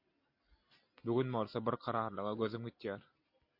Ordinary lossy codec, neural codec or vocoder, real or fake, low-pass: MP3, 32 kbps; none; real; 5.4 kHz